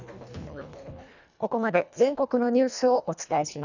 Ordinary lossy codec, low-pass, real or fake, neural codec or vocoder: none; 7.2 kHz; fake; codec, 24 kHz, 1.5 kbps, HILCodec